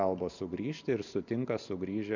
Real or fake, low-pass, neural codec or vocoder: real; 7.2 kHz; none